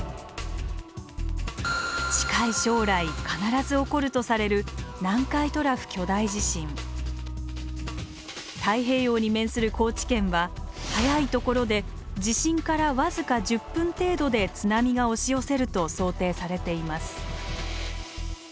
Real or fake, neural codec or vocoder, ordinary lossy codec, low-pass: real; none; none; none